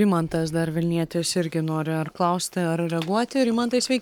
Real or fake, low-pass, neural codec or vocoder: fake; 19.8 kHz; codec, 44.1 kHz, 7.8 kbps, Pupu-Codec